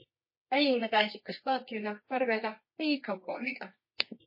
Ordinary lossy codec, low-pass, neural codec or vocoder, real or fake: MP3, 24 kbps; 5.4 kHz; codec, 24 kHz, 0.9 kbps, WavTokenizer, medium music audio release; fake